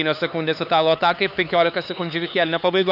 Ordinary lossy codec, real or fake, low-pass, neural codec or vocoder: AAC, 48 kbps; fake; 5.4 kHz; codec, 16 kHz, 4 kbps, X-Codec, HuBERT features, trained on LibriSpeech